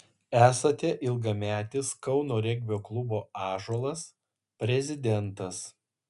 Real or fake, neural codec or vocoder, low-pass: real; none; 10.8 kHz